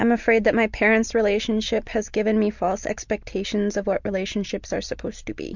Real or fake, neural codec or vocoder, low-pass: real; none; 7.2 kHz